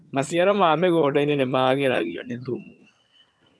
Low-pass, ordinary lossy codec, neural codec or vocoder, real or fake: none; none; vocoder, 22.05 kHz, 80 mel bands, HiFi-GAN; fake